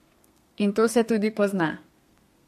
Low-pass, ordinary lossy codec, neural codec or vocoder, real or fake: 14.4 kHz; MP3, 64 kbps; codec, 32 kHz, 1.9 kbps, SNAC; fake